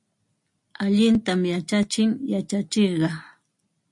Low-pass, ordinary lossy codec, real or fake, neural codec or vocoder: 10.8 kHz; MP3, 48 kbps; real; none